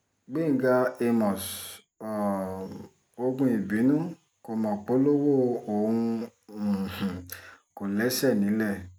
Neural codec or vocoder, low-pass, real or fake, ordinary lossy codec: none; none; real; none